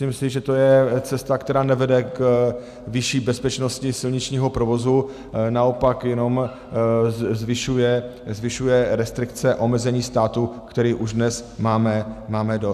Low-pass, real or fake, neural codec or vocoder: 14.4 kHz; real; none